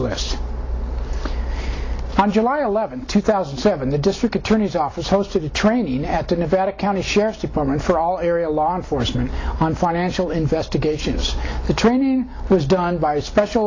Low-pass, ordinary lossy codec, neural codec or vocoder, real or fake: 7.2 kHz; AAC, 32 kbps; none; real